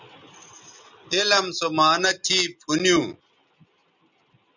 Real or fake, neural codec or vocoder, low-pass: real; none; 7.2 kHz